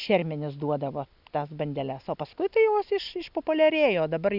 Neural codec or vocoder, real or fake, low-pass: none; real; 5.4 kHz